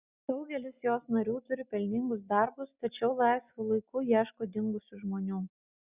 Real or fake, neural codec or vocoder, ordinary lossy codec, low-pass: real; none; Opus, 64 kbps; 3.6 kHz